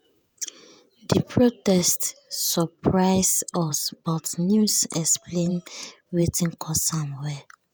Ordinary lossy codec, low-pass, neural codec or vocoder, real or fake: none; none; vocoder, 48 kHz, 128 mel bands, Vocos; fake